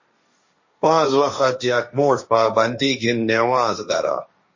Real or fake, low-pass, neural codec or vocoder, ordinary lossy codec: fake; 7.2 kHz; codec, 16 kHz, 1.1 kbps, Voila-Tokenizer; MP3, 32 kbps